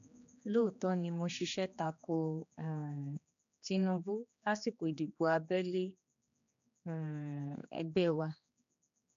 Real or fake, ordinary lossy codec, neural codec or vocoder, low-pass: fake; none; codec, 16 kHz, 2 kbps, X-Codec, HuBERT features, trained on general audio; 7.2 kHz